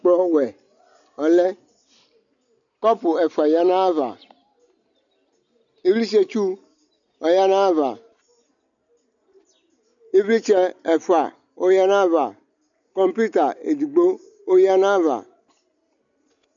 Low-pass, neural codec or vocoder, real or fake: 7.2 kHz; none; real